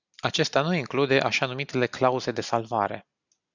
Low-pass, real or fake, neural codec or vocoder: 7.2 kHz; real; none